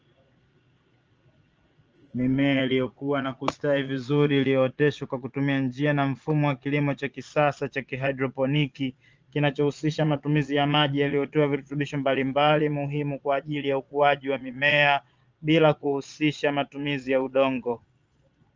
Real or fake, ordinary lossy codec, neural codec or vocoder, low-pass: fake; Opus, 24 kbps; vocoder, 22.05 kHz, 80 mel bands, Vocos; 7.2 kHz